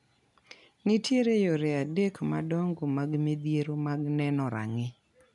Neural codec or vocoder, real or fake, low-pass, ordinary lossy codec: none; real; 10.8 kHz; none